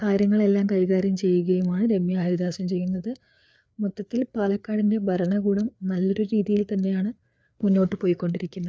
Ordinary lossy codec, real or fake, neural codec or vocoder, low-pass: none; fake; codec, 16 kHz, 4 kbps, FreqCodec, larger model; none